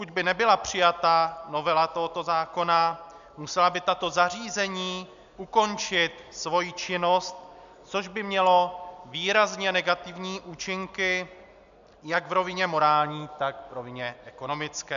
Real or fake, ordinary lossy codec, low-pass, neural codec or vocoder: real; MP3, 96 kbps; 7.2 kHz; none